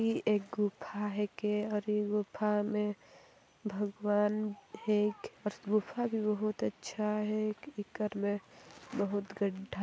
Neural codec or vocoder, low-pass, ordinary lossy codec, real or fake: none; none; none; real